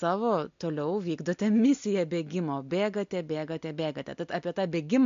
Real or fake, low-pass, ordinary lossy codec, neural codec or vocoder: real; 7.2 kHz; MP3, 48 kbps; none